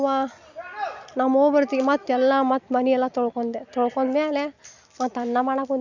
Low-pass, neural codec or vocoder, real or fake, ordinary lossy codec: 7.2 kHz; none; real; none